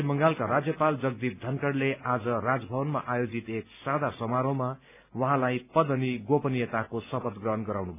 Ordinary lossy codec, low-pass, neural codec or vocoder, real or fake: none; 3.6 kHz; none; real